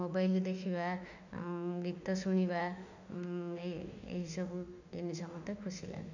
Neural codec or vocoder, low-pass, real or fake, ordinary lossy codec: autoencoder, 48 kHz, 32 numbers a frame, DAC-VAE, trained on Japanese speech; 7.2 kHz; fake; none